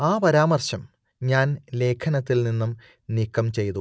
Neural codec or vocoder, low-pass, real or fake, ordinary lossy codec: none; none; real; none